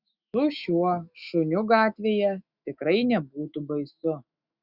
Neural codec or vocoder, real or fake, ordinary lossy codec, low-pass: autoencoder, 48 kHz, 128 numbers a frame, DAC-VAE, trained on Japanese speech; fake; Opus, 64 kbps; 5.4 kHz